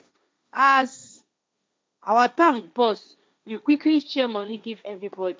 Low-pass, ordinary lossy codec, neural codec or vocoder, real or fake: none; none; codec, 16 kHz, 1.1 kbps, Voila-Tokenizer; fake